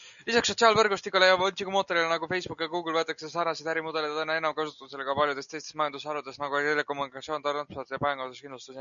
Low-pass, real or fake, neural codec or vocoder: 7.2 kHz; real; none